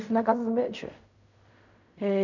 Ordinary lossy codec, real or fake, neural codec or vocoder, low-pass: none; fake; codec, 16 kHz in and 24 kHz out, 0.4 kbps, LongCat-Audio-Codec, fine tuned four codebook decoder; 7.2 kHz